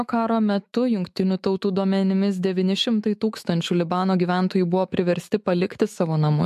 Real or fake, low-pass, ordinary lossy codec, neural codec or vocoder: fake; 14.4 kHz; MP3, 64 kbps; autoencoder, 48 kHz, 128 numbers a frame, DAC-VAE, trained on Japanese speech